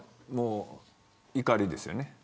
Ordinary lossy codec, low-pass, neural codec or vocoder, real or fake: none; none; none; real